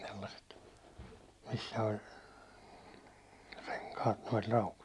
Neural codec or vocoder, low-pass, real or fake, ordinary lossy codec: none; none; real; none